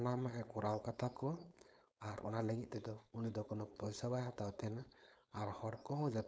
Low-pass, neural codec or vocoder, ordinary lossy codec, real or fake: none; codec, 16 kHz, 4.8 kbps, FACodec; none; fake